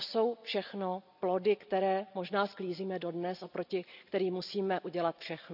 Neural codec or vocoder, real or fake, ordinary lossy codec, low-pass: none; real; none; 5.4 kHz